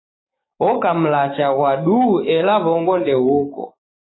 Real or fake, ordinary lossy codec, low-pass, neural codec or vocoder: fake; AAC, 16 kbps; 7.2 kHz; codec, 16 kHz, 6 kbps, DAC